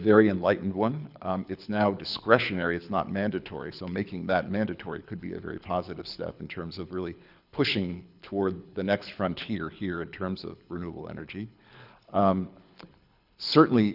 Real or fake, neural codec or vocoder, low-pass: fake; codec, 24 kHz, 6 kbps, HILCodec; 5.4 kHz